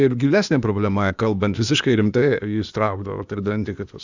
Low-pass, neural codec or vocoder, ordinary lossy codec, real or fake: 7.2 kHz; codec, 16 kHz, 0.8 kbps, ZipCodec; Opus, 64 kbps; fake